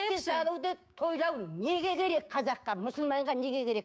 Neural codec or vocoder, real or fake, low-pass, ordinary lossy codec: codec, 16 kHz, 6 kbps, DAC; fake; none; none